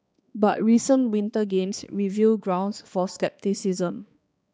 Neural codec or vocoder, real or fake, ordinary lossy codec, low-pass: codec, 16 kHz, 2 kbps, X-Codec, HuBERT features, trained on balanced general audio; fake; none; none